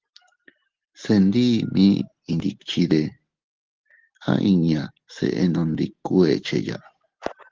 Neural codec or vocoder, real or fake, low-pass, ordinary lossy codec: none; real; 7.2 kHz; Opus, 16 kbps